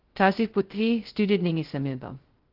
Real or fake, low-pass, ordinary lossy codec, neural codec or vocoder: fake; 5.4 kHz; Opus, 16 kbps; codec, 16 kHz, 0.2 kbps, FocalCodec